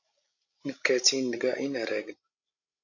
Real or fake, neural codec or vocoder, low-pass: fake; codec, 16 kHz, 16 kbps, FreqCodec, larger model; 7.2 kHz